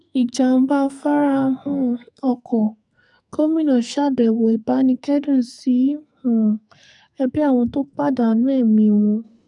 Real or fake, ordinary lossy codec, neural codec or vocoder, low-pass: fake; none; codec, 44.1 kHz, 2.6 kbps, SNAC; 10.8 kHz